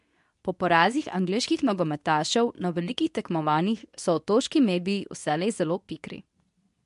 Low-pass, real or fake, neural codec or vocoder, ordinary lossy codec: 10.8 kHz; fake; codec, 24 kHz, 0.9 kbps, WavTokenizer, medium speech release version 2; MP3, 64 kbps